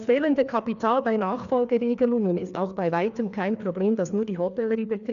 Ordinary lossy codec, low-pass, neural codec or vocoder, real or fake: none; 7.2 kHz; codec, 16 kHz, 2 kbps, FreqCodec, larger model; fake